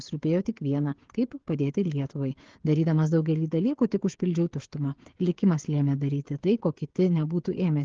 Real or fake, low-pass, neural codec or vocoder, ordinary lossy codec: fake; 7.2 kHz; codec, 16 kHz, 16 kbps, FreqCodec, smaller model; Opus, 16 kbps